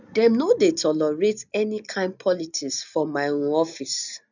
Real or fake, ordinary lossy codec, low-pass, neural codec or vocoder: real; none; 7.2 kHz; none